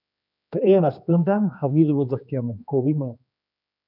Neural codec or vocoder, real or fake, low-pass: codec, 16 kHz, 2 kbps, X-Codec, HuBERT features, trained on general audio; fake; 5.4 kHz